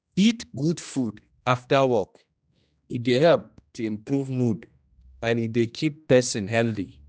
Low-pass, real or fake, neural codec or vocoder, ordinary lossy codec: none; fake; codec, 16 kHz, 1 kbps, X-Codec, HuBERT features, trained on general audio; none